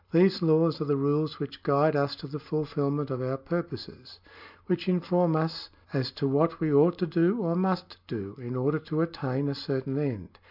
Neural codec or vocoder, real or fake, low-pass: none; real; 5.4 kHz